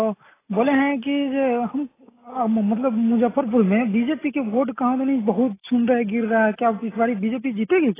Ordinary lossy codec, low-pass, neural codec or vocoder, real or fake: AAC, 16 kbps; 3.6 kHz; none; real